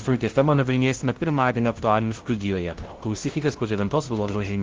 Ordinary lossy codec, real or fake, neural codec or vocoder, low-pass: Opus, 16 kbps; fake; codec, 16 kHz, 0.5 kbps, FunCodec, trained on LibriTTS, 25 frames a second; 7.2 kHz